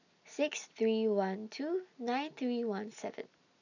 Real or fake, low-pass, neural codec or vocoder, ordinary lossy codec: real; 7.2 kHz; none; none